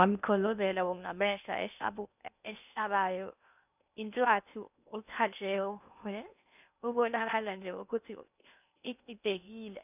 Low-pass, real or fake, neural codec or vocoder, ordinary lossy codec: 3.6 kHz; fake; codec, 16 kHz in and 24 kHz out, 0.6 kbps, FocalCodec, streaming, 2048 codes; none